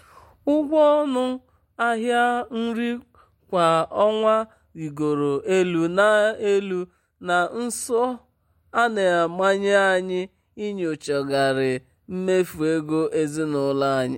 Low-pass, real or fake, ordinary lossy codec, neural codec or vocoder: 19.8 kHz; real; MP3, 64 kbps; none